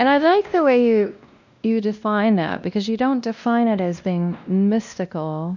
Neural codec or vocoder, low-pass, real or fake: codec, 16 kHz, 1 kbps, X-Codec, WavLM features, trained on Multilingual LibriSpeech; 7.2 kHz; fake